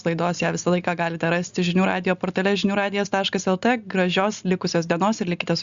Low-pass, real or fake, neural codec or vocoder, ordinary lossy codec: 7.2 kHz; real; none; Opus, 64 kbps